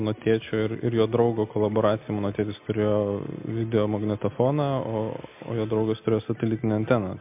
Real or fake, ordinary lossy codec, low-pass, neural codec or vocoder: real; MP3, 32 kbps; 3.6 kHz; none